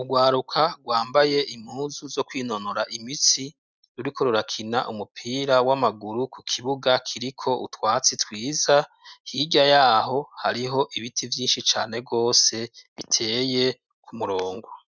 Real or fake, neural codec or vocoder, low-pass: real; none; 7.2 kHz